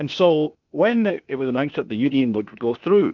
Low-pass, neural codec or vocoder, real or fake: 7.2 kHz; codec, 16 kHz, 0.8 kbps, ZipCodec; fake